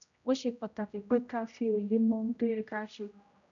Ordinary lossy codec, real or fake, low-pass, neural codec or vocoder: none; fake; 7.2 kHz; codec, 16 kHz, 0.5 kbps, X-Codec, HuBERT features, trained on general audio